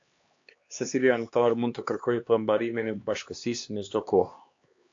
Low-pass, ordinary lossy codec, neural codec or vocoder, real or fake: 7.2 kHz; AAC, 48 kbps; codec, 16 kHz, 2 kbps, X-Codec, HuBERT features, trained on LibriSpeech; fake